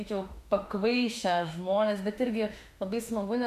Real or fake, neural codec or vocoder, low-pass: fake; autoencoder, 48 kHz, 32 numbers a frame, DAC-VAE, trained on Japanese speech; 14.4 kHz